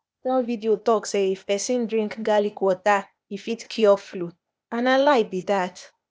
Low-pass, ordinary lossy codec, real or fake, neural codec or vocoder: none; none; fake; codec, 16 kHz, 0.8 kbps, ZipCodec